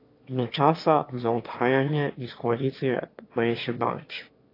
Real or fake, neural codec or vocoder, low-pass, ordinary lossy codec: fake; autoencoder, 22.05 kHz, a latent of 192 numbers a frame, VITS, trained on one speaker; 5.4 kHz; AAC, 32 kbps